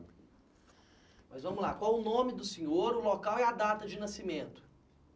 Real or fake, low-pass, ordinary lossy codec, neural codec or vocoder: real; none; none; none